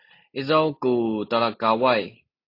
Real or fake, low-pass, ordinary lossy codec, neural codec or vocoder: real; 5.4 kHz; AAC, 32 kbps; none